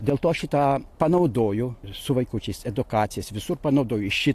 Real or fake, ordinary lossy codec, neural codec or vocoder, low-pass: real; AAC, 64 kbps; none; 14.4 kHz